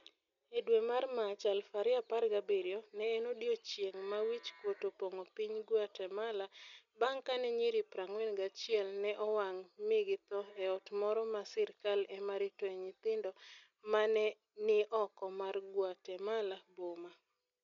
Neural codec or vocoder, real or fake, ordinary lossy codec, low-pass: none; real; none; 7.2 kHz